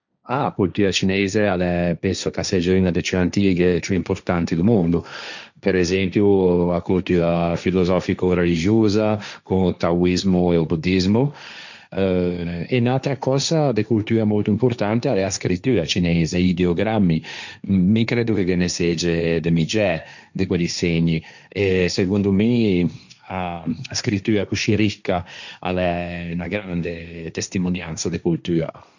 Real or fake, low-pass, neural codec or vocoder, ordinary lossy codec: fake; 7.2 kHz; codec, 16 kHz, 1.1 kbps, Voila-Tokenizer; none